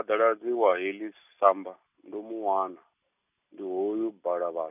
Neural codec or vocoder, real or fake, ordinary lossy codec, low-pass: none; real; none; 3.6 kHz